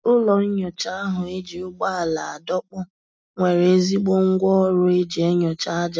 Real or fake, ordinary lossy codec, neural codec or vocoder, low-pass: real; none; none; none